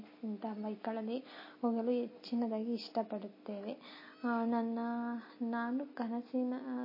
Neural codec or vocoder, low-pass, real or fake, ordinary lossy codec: none; 5.4 kHz; real; MP3, 24 kbps